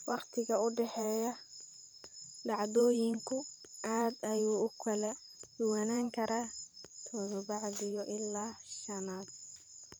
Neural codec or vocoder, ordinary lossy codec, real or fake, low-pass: vocoder, 44.1 kHz, 128 mel bands every 512 samples, BigVGAN v2; none; fake; none